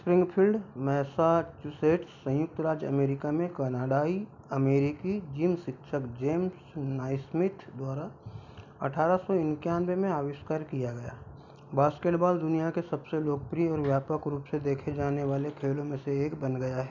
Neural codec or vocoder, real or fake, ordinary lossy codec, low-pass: none; real; none; 7.2 kHz